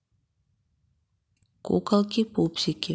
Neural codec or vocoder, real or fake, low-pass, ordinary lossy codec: none; real; none; none